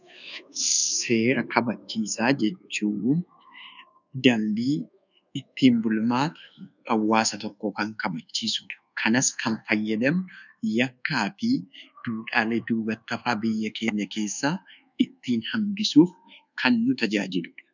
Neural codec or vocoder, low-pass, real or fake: codec, 24 kHz, 1.2 kbps, DualCodec; 7.2 kHz; fake